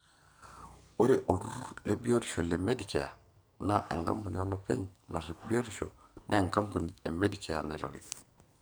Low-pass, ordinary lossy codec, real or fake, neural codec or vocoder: none; none; fake; codec, 44.1 kHz, 2.6 kbps, SNAC